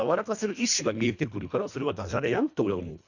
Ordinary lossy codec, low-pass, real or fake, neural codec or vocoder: none; 7.2 kHz; fake; codec, 24 kHz, 1.5 kbps, HILCodec